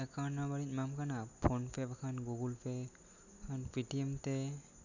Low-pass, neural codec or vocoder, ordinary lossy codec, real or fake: 7.2 kHz; none; none; real